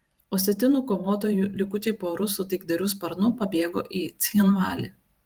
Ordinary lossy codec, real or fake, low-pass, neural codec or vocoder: Opus, 24 kbps; fake; 19.8 kHz; vocoder, 44.1 kHz, 128 mel bands every 512 samples, BigVGAN v2